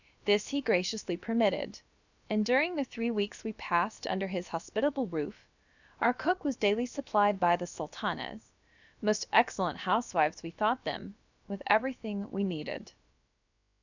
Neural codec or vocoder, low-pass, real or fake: codec, 16 kHz, about 1 kbps, DyCAST, with the encoder's durations; 7.2 kHz; fake